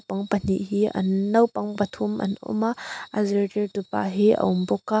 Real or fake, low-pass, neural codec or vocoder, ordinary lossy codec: real; none; none; none